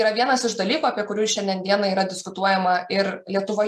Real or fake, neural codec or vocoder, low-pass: real; none; 14.4 kHz